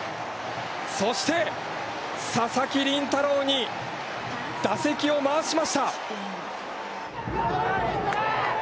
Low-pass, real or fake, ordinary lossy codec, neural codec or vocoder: none; real; none; none